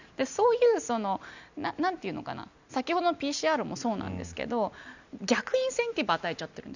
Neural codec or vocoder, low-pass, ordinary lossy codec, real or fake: none; 7.2 kHz; none; real